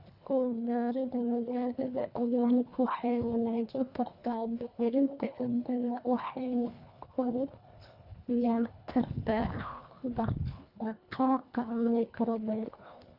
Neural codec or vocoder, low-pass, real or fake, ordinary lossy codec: codec, 24 kHz, 1.5 kbps, HILCodec; 5.4 kHz; fake; none